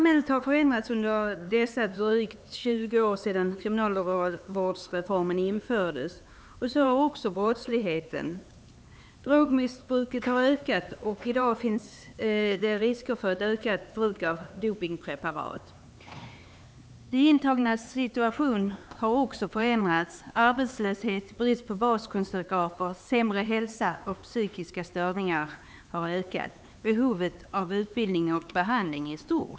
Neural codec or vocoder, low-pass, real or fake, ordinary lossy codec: codec, 16 kHz, 4 kbps, X-Codec, HuBERT features, trained on LibriSpeech; none; fake; none